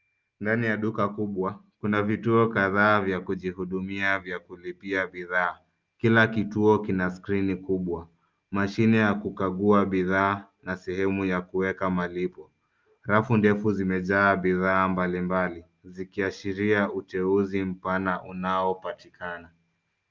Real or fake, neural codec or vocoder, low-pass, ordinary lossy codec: real; none; 7.2 kHz; Opus, 24 kbps